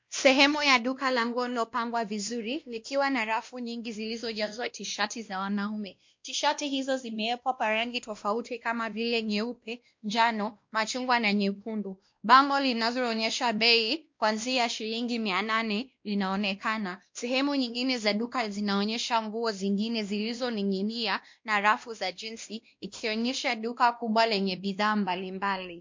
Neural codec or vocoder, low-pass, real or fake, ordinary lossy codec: codec, 16 kHz, 1 kbps, X-Codec, WavLM features, trained on Multilingual LibriSpeech; 7.2 kHz; fake; MP3, 48 kbps